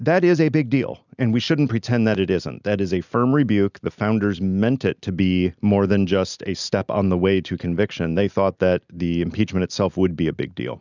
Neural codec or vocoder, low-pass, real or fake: none; 7.2 kHz; real